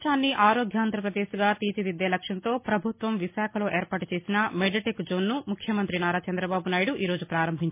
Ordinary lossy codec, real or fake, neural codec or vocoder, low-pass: MP3, 24 kbps; real; none; 3.6 kHz